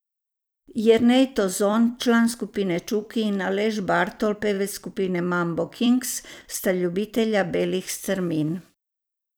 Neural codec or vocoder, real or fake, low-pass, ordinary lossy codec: vocoder, 44.1 kHz, 128 mel bands every 256 samples, BigVGAN v2; fake; none; none